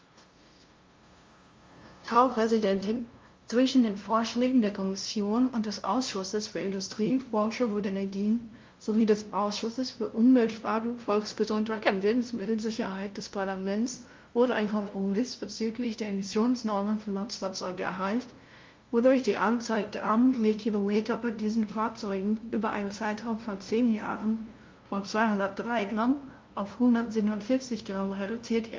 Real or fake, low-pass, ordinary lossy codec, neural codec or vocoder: fake; 7.2 kHz; Opus, 32 kbps; codec, 16 kHz, 0.5 kbps, FunCodec, trained on LibriTTS, 25 frames a second